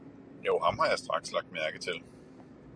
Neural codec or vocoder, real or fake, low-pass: none; real; 9.9 kHz